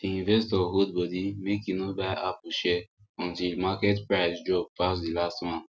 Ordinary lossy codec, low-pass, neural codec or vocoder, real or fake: none; none; none; real